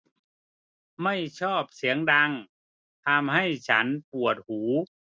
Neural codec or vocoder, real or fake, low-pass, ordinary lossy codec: none; real; none; none